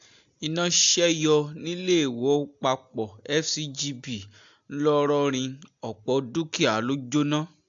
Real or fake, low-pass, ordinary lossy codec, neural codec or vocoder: real; 7.2 kHz; AAC, 64 kbps; none